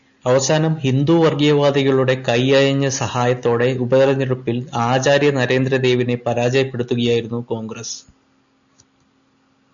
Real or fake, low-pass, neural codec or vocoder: real; 7.2 kHz; none